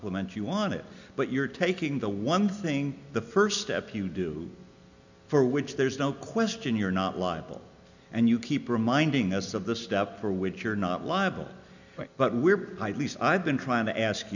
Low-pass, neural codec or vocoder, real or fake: 7.2 kHz; none; real